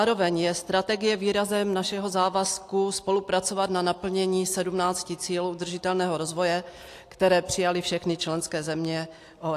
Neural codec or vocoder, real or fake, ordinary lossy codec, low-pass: none; real; AAC, 64 kbps; 14.4 kHz